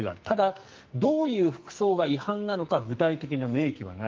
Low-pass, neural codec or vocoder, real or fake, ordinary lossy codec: 7.2 kHz; codec, 44.1 kHz, 2.6 kbps, SNAC; fake; Opus, 32 kbps